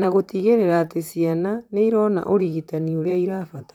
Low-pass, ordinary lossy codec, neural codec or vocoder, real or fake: 19.8 kHz; none; vocoder, 44.1 kHz, 128 mel bands, Pupu-Vocoder; fake